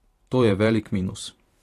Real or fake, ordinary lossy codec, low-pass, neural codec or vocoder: fake; AAC, 48 kbps; 14.4 kHz; vocoder, 44.1 kHz, 128 mel bands every 512 samples, BigVGAN v2